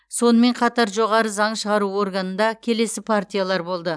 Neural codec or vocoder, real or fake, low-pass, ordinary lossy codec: none; real; none; none